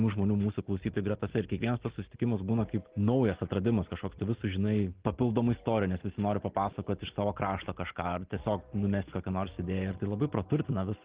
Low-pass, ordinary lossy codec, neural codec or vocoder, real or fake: 3.6 kHz; Opus, 16 kbps; none; real